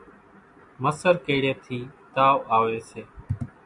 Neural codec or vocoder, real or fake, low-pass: none; real; 10.8 kHz